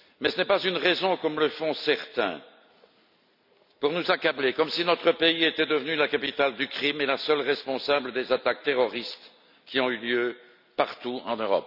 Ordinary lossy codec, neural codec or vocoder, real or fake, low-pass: none; none; real; 5.4 kHz